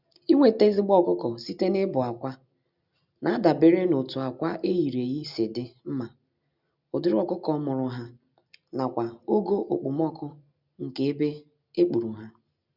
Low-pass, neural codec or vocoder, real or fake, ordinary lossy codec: 5.4 kHz; none; real; none